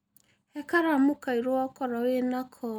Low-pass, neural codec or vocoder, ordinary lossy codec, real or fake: none; none; none; real